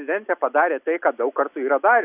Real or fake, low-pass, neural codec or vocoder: real; 3.6 kHz; none